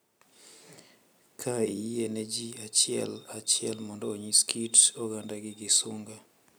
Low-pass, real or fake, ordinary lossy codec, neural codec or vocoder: none; real; none; none